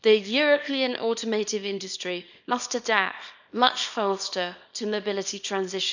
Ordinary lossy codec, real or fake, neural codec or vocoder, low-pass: none; fake; codec, 24 kHz, 0.9 kbps, WavTokenizer, small release; 7.2 kHz